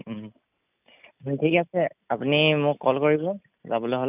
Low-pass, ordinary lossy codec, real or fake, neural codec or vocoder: 3.6 kHz; none; real; none